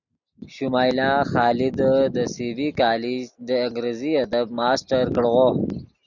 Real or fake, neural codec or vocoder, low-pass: real; none; 7.2 kHz